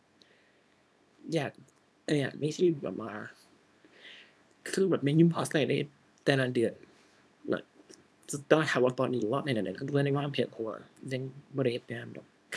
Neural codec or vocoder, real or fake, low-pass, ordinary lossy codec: codec, 24 kHz, 0.9 kbps, WavTokenizer, small release; fake; none; none